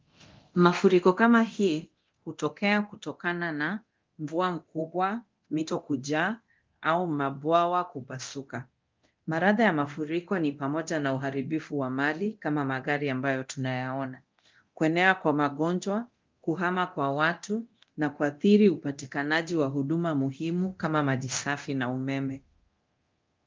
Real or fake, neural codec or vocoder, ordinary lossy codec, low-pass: fake; codec, 24 kHz, 0.9 kbps, DualCodec; Opus, 32 kbps; 7.2 kHz